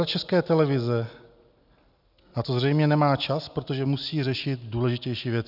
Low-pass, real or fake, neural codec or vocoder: 5.4 kHz; real; none